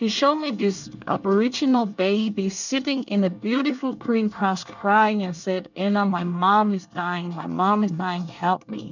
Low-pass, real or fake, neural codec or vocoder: 7.2 kHz; fake; codec, 24 kHz, 1 kbps, SNAC